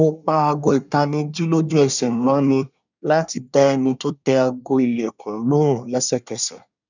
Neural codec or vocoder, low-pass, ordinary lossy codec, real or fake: codec, 24 kHz, 1 kbps, SNAC; 7.2 kHz; none; fake